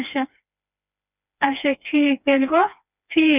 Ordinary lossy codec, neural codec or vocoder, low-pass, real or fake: none; codec, 16 kHz, 2 kbps, FreqCodec, smaller model; 3.6 kHz; fake